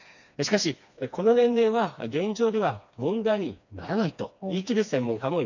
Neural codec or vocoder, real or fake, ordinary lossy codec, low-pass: codec, 16 kHz, 2 kbps, FreqCodec, smaller model; fake; none; 7.2 kHz